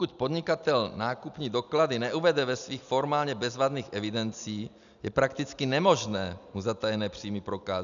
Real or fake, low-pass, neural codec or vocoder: real; 7.2 kHz; none